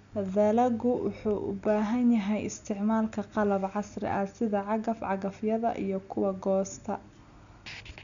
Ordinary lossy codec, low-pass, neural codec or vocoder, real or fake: none; 7.2 kHz; none; real